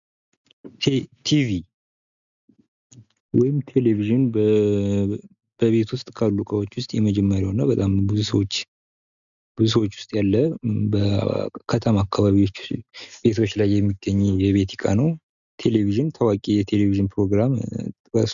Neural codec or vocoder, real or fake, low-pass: none; real; 7.2 kHz